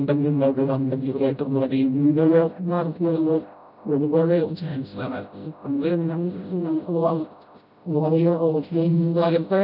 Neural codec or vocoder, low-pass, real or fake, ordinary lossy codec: codec, 16 kHz, 0.5 kbps, FreqCodec, smaller model; 5.4 kHz; fake; none